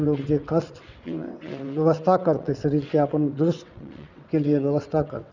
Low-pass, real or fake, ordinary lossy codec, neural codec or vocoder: 7.2 kHz; fake; none; vocoder, 22.05 kHz, 80 mel bands, WaveNeXt